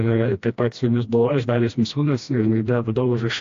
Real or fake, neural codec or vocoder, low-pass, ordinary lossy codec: fake; codec, 16 kHz, 1 kbps, FreqCodec, smaller model; 7.2 kHz; AAC, 48 kbps